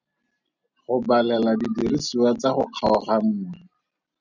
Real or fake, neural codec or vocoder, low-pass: real; none; 7.2 kHz